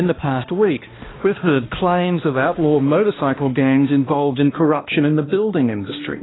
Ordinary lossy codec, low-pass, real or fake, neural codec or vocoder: AAC, 16 kbps; 7.2 kHz; fake; codec, 16 kHz, 1 kbps, X-Codec, HuBERT features, trained on balanced general audio